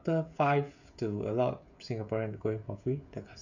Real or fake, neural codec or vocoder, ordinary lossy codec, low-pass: fake; codec, 16 kHz, 16 kbps, FreqCodec, smaller model; none; 7.2 kHz